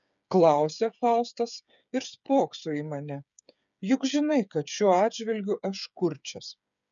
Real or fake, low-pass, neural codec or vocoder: fake; 7.2 kHz; codec, 16 kHz, 8 kbps, FreqCodec, smaller model